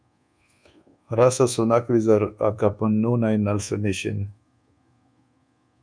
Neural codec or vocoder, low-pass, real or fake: codec, 24 kHz, 1.2 kbps, DualCodec; 9.9 kHz; fake